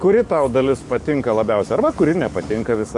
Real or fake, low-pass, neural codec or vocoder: fake; 10.8 kHz; codec, 44.1 kHz, 7.8 kbps, DAC